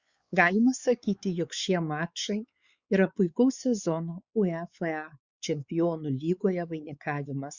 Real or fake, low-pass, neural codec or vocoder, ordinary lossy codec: fake; 7.2 kHz; codec, 16 kHz, 4 kbps, X-Codec, WavLM features, trained on Multilingual LibriSpeech; Opus, 64 kbps